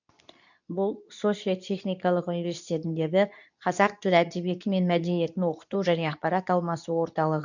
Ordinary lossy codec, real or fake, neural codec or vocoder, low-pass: none; fake; codec, 24 kHz, 0.9 kbps, WavTokenizer, medium speech release version 2; 7.2 kHz